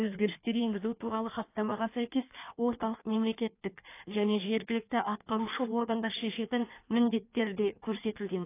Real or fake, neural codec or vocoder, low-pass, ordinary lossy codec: fake; codec, 16 kHz in and 24 kHz out, 1.1 kbps, FireRedTTS-2 codec; 3.6 kHz; AAC, 24 kbps